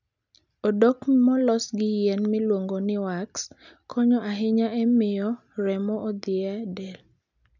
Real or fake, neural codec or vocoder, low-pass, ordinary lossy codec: real; none; 7.2 kHz; none